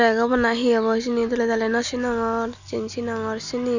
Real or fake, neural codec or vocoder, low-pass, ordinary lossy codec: real; none; 7.2 kHz; none